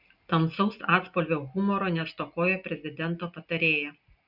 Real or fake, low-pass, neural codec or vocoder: real; 5.4 kHz; none